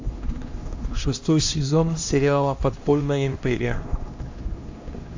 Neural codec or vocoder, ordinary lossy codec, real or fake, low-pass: codec, 16 kHz, 1 kbps, X-Codec, HuBERT features, trained on LibriSpeech; AAC, 48 kbps; fake; 7.2 kHz